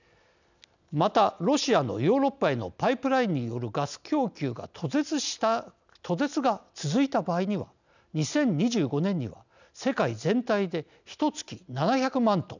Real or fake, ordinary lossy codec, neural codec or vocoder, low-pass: real; none; none; 7.2 kHz